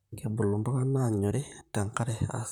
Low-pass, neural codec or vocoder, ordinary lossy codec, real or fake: 19.8 kHz; vocoder, 44.1 kHz, 128 mel bands, Pupu-Vocoder; none; fake